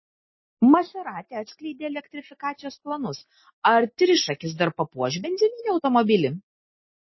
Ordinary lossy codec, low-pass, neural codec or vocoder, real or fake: MP3, 24 kbps; 7.2 kHz; none; real